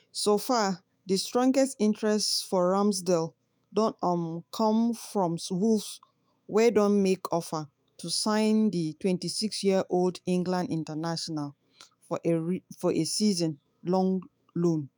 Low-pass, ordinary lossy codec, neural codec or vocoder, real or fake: none; none; autoencoder, 48 kHz, 128 numbers a frame, DAC-VAE, trained on Japanese speech; fake